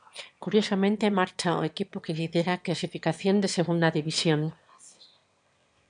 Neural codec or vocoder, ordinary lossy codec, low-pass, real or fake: autoencoder, 22.05 kHz, a latent of 192 numbers a frame, VITS, trained on one speaker; MP3, 96 kbps; 9.9 kHz; fake